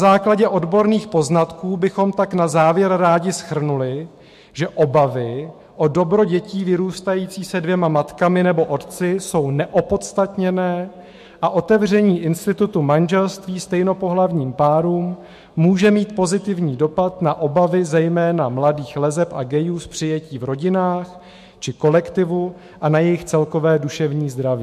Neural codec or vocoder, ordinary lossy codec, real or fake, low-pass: autoencoder, 48 kHz, 128 numbers a frame, DAC-VAE, trained on Japanese speech; MP3, 64 kbps; fake; 14.4 kHz